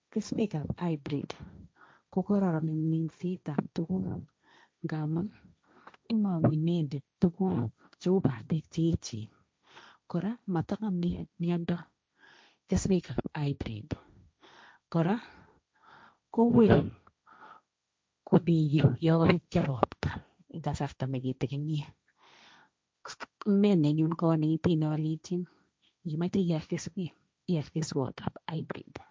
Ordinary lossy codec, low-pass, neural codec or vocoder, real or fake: none; none; codec, 16 kHz, 1.1 kbps, Voila-Tokenizer; fake